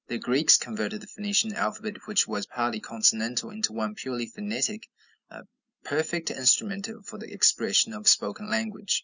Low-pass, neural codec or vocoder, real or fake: 7.2 kHz; none; real